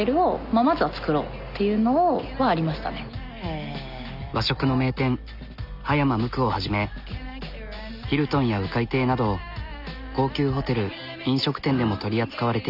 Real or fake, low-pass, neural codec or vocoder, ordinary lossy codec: real; 5.4 kHz; none; none